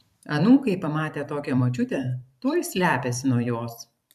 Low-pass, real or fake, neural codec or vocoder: 14.4 kHz; fake; vocoder, 44.1 kHz, 128 mel bands every 512 samples, BigVGAN v2